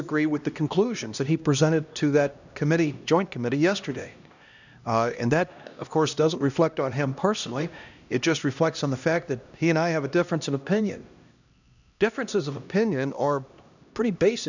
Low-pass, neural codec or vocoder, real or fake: 7.2 kHz; codec, 16 kHz, 1 kbps, X-Codec, HuBERT features, trained on LibriSpeech; fake